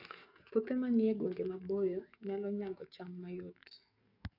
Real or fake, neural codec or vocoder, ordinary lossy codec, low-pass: fake; codec, 44.1 kHz, 7.8 kbps, DAC; none; 5.4 kHz